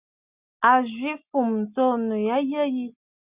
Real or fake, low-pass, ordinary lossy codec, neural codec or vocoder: real; 3.6 kHz; Opus, 64 kbps; none